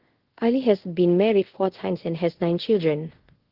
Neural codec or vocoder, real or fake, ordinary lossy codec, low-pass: codec, 24 kHz, 0.5 kbps, DualCodec; fake; Opus, 16 kbps; 5.4 kHz